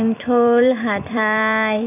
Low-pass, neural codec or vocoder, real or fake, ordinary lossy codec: 3.6 kHz; vocoder, 44.1 kHz, 128 mel bands every 256 samples, BigVGAN v2; fake; none